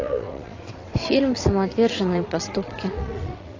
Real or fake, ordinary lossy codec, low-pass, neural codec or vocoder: fake; AAC, 32 kbps; 7.2 kHz; vocoder, 22.05 kHz, 80 mel bands, WaveNeXt